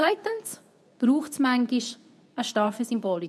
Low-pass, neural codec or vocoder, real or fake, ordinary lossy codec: none; codec, 24 kHz, 0.9 kbps, WavTokenizer, medium speech release version 2; fake; none